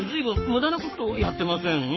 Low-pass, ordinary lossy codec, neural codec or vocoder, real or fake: 7.2 kHz; MP3, 24 kbps; codec, 44.1 kHz, 7.8 kbps, Pupu-Codec; fake